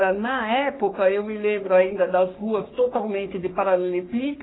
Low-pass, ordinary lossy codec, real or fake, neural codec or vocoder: 7.2 kHz; AAC, 16 kbps; fake; codec, 44.1 kHz, 3.4 kbps, Pupu-Codec